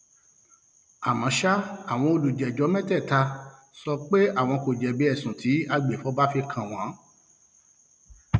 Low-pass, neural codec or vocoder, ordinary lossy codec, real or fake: none; none; none; real